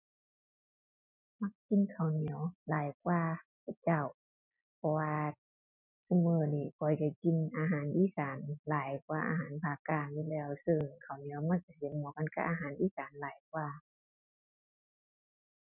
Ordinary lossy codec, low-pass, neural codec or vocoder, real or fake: none; 3.6 kHz; none; real